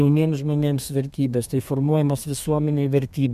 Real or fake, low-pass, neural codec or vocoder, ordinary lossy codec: fake; 14.4 kHz; codec, 32 kHz, 1.9 kbps, SNAC; MP3, 96 kbps